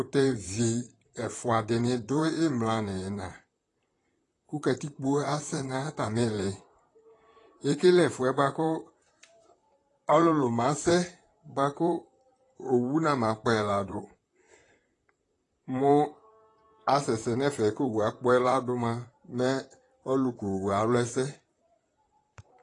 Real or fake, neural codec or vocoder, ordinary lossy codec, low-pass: fake; vocoder, 44.1 kHz, 128 mel bands, Pupu-Vocoder; AAC, 32 kbps; 10.8 kHz